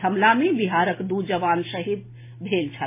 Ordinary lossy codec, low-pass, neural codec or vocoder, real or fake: MP3, 16 kbps; 3.6 kHz; none; real